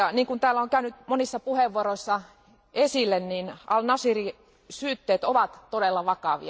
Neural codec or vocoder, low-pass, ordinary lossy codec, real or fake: none; none; none; real